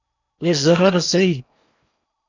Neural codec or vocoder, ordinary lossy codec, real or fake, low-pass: codec, 16 kHz in and 24 kHz out, 0.8 kbps, FocalCodec, streaming, 65536 codes; MP3, 64 kbps; fake; 7.2 kHz